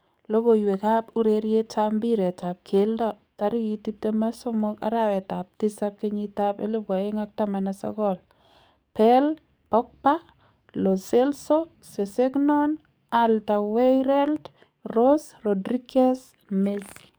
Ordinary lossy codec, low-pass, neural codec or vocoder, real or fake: none; none; codec, 44.1 kHz, 7.8 kbps, DAC; fake